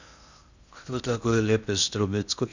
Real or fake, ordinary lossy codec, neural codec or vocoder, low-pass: fake; none; codec, 16 kHz in and 24 kHz out, 0.6 kbps, FocalCodec, streaming, 2048 codes; 7.2 kHz